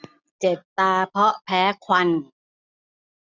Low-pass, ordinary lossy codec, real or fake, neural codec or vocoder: 7.2 kHz; none; real; none